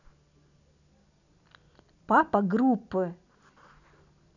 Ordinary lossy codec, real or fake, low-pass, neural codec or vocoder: none; real; 7.2 kHz; none